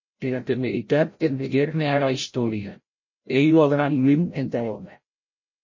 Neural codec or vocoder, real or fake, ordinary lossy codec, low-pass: codec, 16 kHz, 0.5 kbps, FreqCodec, larger model; fake; MP3, 32 kbps; 7.2 kHz